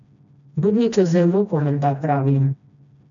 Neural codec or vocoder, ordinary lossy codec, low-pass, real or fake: codec, 16 kHz, 1 kbps, FreqCodec, smaller model; none; 7.2 kHz; fake